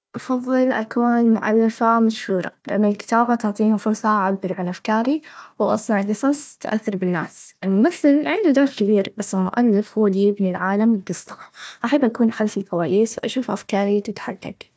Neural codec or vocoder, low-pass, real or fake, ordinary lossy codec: codec, 16 kHz, 1 kbps, FunCodec, trained on Chinese and English, 50 frames a second; none; fake; none